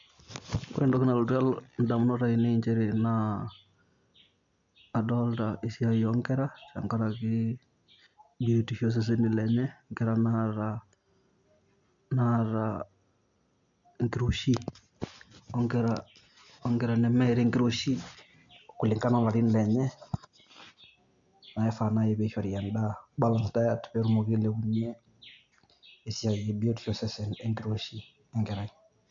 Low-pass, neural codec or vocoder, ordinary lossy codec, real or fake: 7.2 kHz; none; MP3, 64 kbps; real